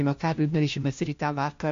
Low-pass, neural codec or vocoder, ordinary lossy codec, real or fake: 7.2 kHz; codec, 16 kHz, 1 kbps, FunCodec, trained on LibriTTS, 50 frames a second; MP3, 48 kbps; fake